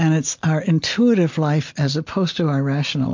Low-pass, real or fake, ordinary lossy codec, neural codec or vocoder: 7.2 kHz; real; MP3, 48 kbps; none